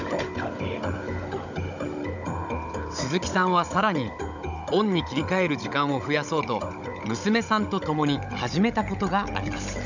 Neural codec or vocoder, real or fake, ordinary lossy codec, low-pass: codec, 16 kHz, 16 kbps, FunCodec, trained on Chinese and English, 50 frames a second; fake; none; 7.2 kHz